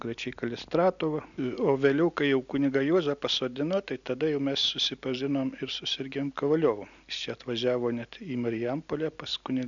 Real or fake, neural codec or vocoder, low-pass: real; none; 7.2 kHz